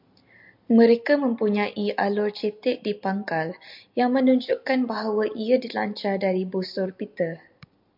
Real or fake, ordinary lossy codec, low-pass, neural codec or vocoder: real; MP3, 48 kbps; 5.4 kHz; none